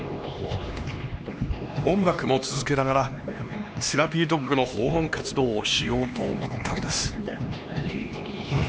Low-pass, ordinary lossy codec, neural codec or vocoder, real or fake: none; none; codec, 16 kHz, 2 kbps, X-Codec, HuBERT features, trained on LibriSpeech; fake